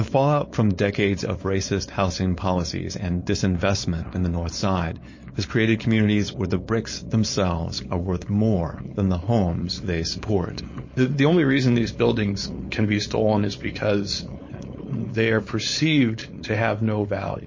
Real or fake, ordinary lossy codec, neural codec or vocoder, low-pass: fake; MP3, 32 kbps; codec, 16 kHz, 4.8 kbps, FACodec; 7.2 kHz